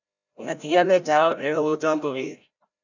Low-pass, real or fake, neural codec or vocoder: 7.2 kHz; fake; codec, 16 kHz, 0.5 kbps, FreqCodec, larger model